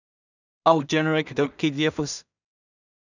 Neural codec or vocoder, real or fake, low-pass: codec, 16 kHz in and 24 kHz out, 0.4 kbps, LongCat-Audio-Codec, two codebook decoder; fake; 7.2 kHz